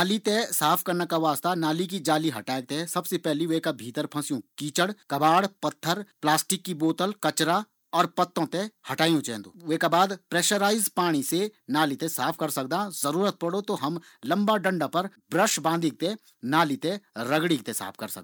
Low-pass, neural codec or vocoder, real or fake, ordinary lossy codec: none; none; real; none